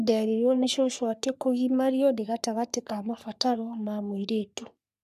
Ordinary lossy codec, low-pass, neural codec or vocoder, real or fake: none; 14.4 kHz; codec, 44.1 kHz, 3.4 kbps, Pupu-Codec; fake